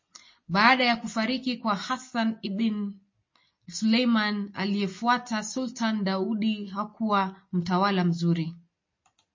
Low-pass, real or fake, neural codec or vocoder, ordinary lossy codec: 7.2 kHz; real; none; MP3, 32 kbps